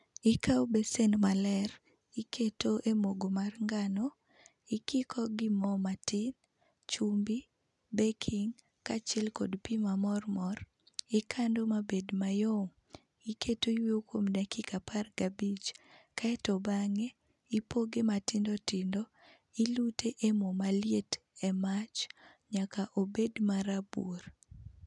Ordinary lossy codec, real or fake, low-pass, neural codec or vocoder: none; real; 10.8 kHz; none